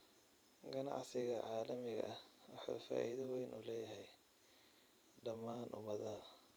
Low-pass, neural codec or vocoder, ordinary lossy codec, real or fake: none; vocoder, 44.1 kHz, 128 mel bands every 512 samples, BigVGAN v2; none; fake